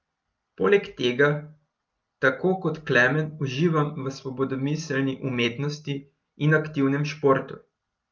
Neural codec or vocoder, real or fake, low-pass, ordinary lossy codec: none; real; 7.2 kHz; Opus, 24 kbps